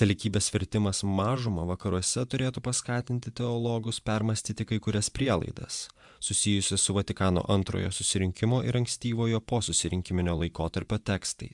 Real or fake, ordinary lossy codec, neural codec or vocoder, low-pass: fake; MP3, 96 kbps; vocoder, 24 kHz, 100 mel bands, Vocos; 10.8 kHz